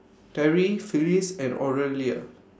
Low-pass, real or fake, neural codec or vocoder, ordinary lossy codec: none; real; none; none